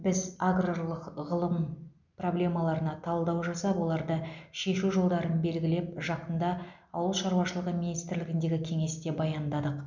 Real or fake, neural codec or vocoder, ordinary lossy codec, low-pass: real; none; MP3, 64 kbps; 7.2 kHz